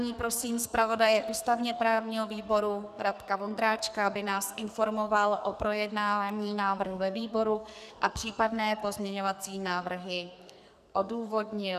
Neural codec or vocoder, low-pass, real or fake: codec, 44.1 kHz, 2.6 kbps, SNAC; 14.4 kHz; fake